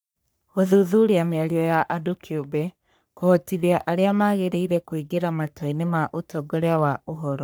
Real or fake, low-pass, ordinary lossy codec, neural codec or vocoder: fake; none; none; codec, 44.1 kHz, 3.4 kbps, Pupu-Codec